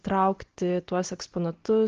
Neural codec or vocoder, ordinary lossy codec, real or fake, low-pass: none; Opus, 16 kbps; real; 7.2 kHz